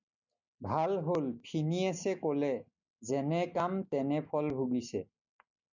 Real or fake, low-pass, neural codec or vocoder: real; 7.2 kHz; none